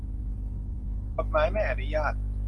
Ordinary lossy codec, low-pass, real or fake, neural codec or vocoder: Opus, 24 kbps; 10.8 kHz; real; none